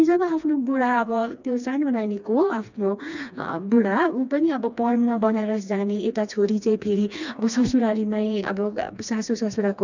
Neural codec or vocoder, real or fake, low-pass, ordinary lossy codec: codec, 16 kHz, 2 kbps, FreqCodec, smaller model; fake; 7.2 kHz; none